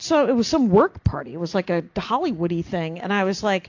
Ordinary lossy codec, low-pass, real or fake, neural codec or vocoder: AAC, 48 kbps; 7.2 kHz; real; none